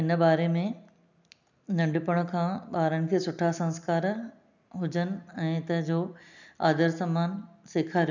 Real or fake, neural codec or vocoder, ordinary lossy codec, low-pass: real; none; none; 7.2 kHz